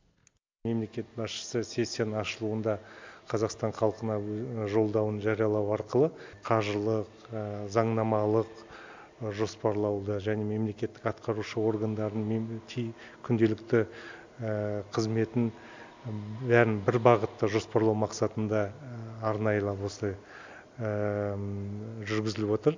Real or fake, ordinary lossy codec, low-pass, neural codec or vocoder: real; MP3, 64 kbps; 7.2 kHz; none